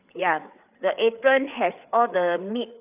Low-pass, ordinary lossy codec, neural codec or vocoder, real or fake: 3.6 kHz; none; codec, 16 kHz, 4 kbps, FreqCodec, larger model; fake